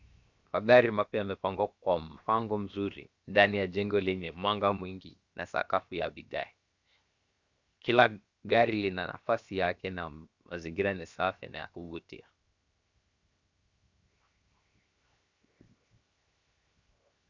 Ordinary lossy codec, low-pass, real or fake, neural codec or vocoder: Opus, 64 kbps; 7.2 kHz; fake; codec, 16 kHz, 0.7 kbps, FocalCodec